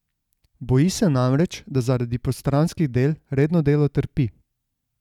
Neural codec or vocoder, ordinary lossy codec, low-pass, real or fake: none; none; 19.8 kHz; real